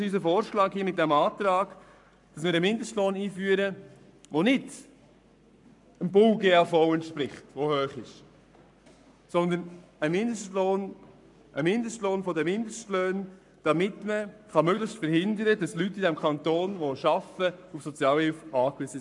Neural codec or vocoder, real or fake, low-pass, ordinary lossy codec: codec, 44.1 kHz, 7.8 kbps, Pupu-Codec; fake; 10.8 kHz; none